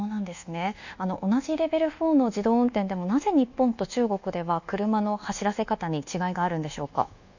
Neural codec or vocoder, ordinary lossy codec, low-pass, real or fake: codec, 24 kHz, 1.2 kbps, DualCodec; none; 7.2 kHz; fake